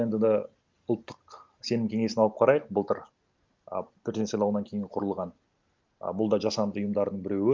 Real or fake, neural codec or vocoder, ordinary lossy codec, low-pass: real; none; Opus, 32 kbps; 7.2 kHz